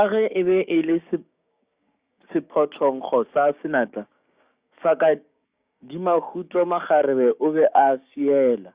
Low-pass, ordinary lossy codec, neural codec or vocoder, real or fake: 3.6 kHz; Opus, 64 kbps; codec, 16 kHz, 6 kbps, DAC; fake